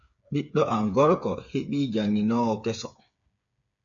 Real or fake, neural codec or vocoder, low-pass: fake; codec, 16 kHz, 8 kbps, FreqCodec, smaller model; 7.2 kHz